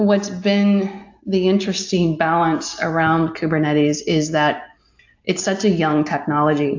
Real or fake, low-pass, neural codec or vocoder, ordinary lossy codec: real; 7.2 kHz; none; AAC, 48 kbps